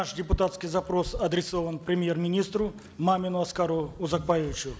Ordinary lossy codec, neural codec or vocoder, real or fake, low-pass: none; none; real; none